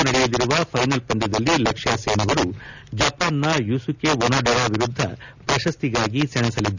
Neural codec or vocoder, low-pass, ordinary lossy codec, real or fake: none; 7.2 kHz; none; real